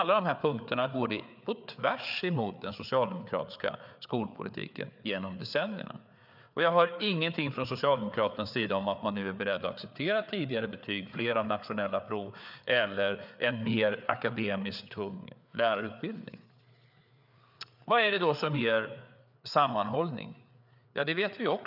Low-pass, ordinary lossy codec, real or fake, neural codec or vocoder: 5.4 kHz; none; fake; codec, 16 kHz, 4 kbps, FreqCodec, larger model